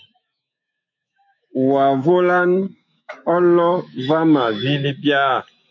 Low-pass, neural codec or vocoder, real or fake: 7.2 kHz; autoencoder, 48 kHz, 128 numbers a frame, DAC-VAE, trained on Japanese speech; fake